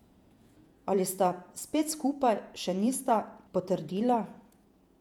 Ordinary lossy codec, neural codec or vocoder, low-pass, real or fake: none; vocoder, 48 kHz, 128 mel bands, Vocos; 19.8 kHz; fake